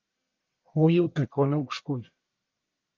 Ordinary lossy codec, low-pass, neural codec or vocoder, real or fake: Opus, 24 kbps; 7.2 kHz; codec, 44.1 kHz, 1.7 kbps, Pupu-Codec; fake